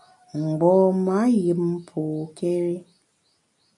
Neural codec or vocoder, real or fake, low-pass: none; real; 10.8 kHz